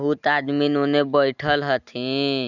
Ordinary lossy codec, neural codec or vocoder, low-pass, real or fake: none; none; 7.2 kHz; real